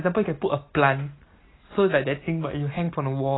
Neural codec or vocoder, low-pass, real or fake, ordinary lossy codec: none; 7.2 kHz; real; AAC, 16 kbps